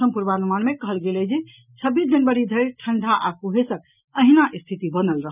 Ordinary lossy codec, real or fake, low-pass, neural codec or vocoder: none; real; 3.6 kHz; none